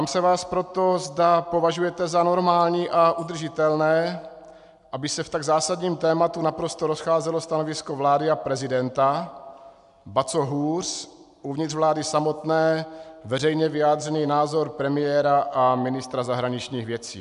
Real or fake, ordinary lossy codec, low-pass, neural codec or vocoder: real; AAC, 96 kbps; 10.8 kHz; none